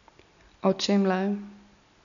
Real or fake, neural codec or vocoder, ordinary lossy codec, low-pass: real; none; none; 7.2 kHz